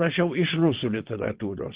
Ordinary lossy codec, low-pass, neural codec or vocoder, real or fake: Opus, 16 kbps; 3.6 kHz; vocoder, 22.05 kHz, 80 mel bands, WaveNeXt; fake